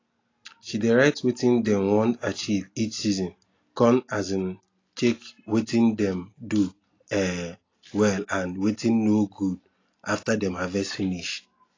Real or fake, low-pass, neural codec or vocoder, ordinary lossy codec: real; 7.2 kHz; none; AAC, 32 kbps